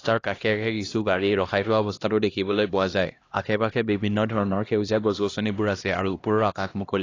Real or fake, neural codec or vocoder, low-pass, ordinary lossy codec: fake; codec, 16 kHz, 1 kbps, X-Codec, HuBERT features, trained on LibriSpeech; 7.2 kHz; AAC, 32 kbps